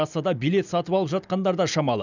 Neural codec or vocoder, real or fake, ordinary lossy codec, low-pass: none; real; none; 7.2 kHz